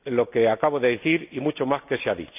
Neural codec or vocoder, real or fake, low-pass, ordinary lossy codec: none; real; 3.6 kHz; none